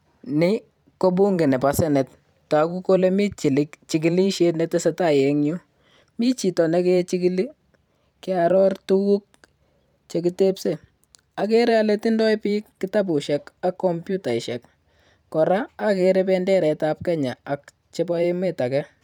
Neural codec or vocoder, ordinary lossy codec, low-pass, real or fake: vocoder, 44.1 kHz, 128 mel bands every 512 samples, BigVGAN v2; none; 19.8 kHz; fake